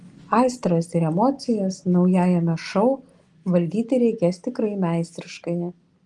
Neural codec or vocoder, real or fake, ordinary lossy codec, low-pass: none; real; Opus, 24 kbps; 10.8 kHz